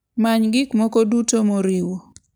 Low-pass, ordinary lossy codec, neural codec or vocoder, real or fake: none; none; none; real